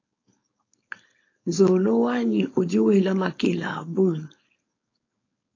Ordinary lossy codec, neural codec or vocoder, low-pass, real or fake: AAC, 32 kbps; codec, 16 kHz, 4.8 kbps, FACodec; 7.2 kHz; fake